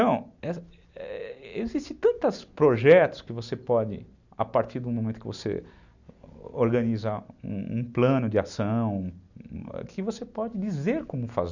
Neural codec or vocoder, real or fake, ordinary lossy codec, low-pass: none; real; none; 7.2 kHz